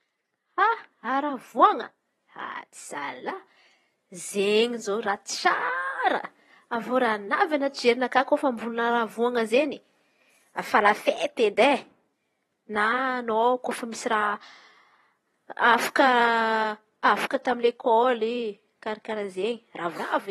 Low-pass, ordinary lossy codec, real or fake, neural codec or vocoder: 19.8 kHz; AAC, 32 kbps; fake; vocoder, 44.1 kHz, 128 mel bands every 512 samples, BigVGAN v2